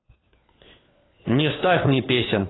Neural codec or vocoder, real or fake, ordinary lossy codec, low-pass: codec, 16 kHz, 4 kbps, FunCodec, trained on LibriTTS, 50 frames a second; fake; AAC, 16 kbps; 7.2 kHz